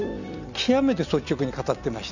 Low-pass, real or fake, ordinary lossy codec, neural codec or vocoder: 7.2 kHz; real; none; none